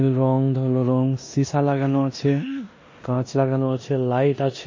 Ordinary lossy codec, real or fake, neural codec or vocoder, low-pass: MP3, 32 kbps; fake; codec, 16 kHz in and 24 kHz out, 0.9 kbps, LongCat-Audio-Codec, four codebook decoder; 7.2 kHz